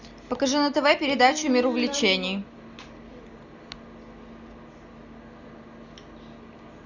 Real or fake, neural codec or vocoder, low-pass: real; none; 7.2 kHz